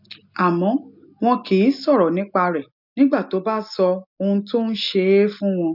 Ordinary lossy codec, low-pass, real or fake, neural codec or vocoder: none; 5.4 kHz; real; none